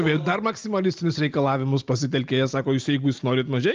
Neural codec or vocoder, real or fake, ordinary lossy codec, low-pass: none; real; Opus, 32 kbps; 7.2 kHz